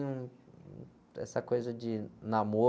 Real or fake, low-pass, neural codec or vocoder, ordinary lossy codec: real; none; none; none